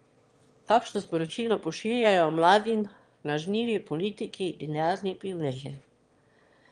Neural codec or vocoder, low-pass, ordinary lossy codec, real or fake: autoencoder, 22.05 kHz, a latent of 192 numbers a frame, VITS, trained on one speaker; 9.9 kHz; Opus, 32 kbps; fake